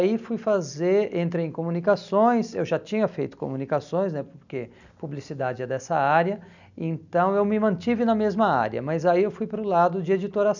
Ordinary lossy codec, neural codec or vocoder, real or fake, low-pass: none; none; real; 7.2 kHz